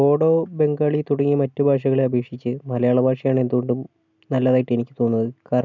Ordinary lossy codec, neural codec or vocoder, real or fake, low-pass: none; none; real; 7.2 kHz